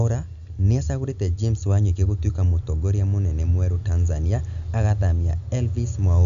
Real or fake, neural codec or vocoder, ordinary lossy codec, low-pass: real; none; AAC, 96 kbps; 7.2 kHz